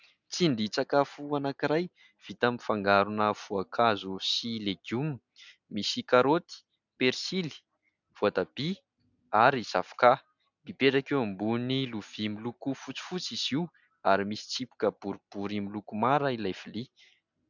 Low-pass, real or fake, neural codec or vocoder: 7.2 kHz; real; none